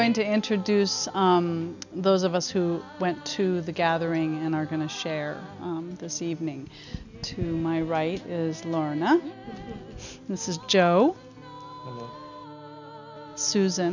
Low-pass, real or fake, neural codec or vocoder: 7.2 kHz; real; none